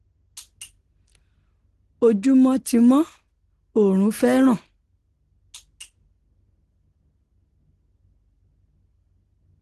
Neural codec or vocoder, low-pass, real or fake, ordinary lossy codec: none; 10.8 kHz; real; Opus, 16 kbps